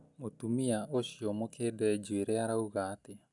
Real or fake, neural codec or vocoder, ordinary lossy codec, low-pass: real; none; none; 10.8 kHz